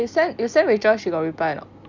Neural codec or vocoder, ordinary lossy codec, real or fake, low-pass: none; none; real; 7.2 kHz